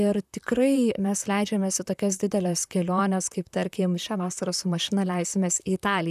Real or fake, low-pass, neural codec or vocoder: fake; 14.4 kHz; vocoder, 44.1 kHz, 128 mel bands, Pupu-Vocoder